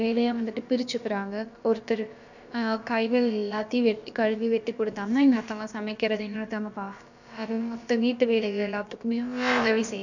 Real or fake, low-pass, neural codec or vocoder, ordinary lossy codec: fake; 7.2 kHz; codec, 16 kHz, about 1 kbps, DyCAST, with the encoder's durations; none